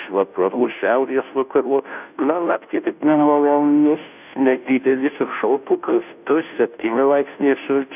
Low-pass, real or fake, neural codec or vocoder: 3.6 kHz; fake; codec, 16 kHz, 0.5 kbps, FunCodec, trained on Chinese and English, 25 frames a second